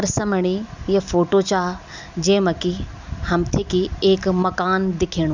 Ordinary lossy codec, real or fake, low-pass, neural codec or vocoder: none; real; 7.2 kHz; none